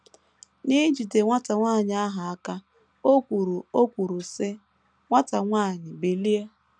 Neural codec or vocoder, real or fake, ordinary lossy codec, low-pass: none; real; none; 9.9 kHz